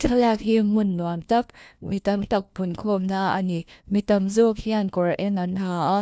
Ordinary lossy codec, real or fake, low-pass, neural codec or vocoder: none; fake; none; codec, 16 kHz, 1 kbps, FunCodec, trained on LibriTTS, 50 frames a second